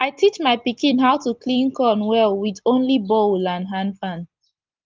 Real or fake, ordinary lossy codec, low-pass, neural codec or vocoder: real; Opus, 32 kbps; 7.2 kHz; none